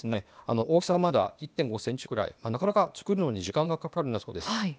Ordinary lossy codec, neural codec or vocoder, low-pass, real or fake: none; codec, 16 kHz, 0.8 kbps, ZipCodec; none; fake